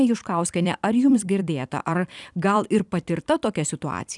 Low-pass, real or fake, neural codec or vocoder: 10.8 kHz; fake; vocoder, 44.1 kHz, 128 mel bands every 256 samples, BigVGAN v2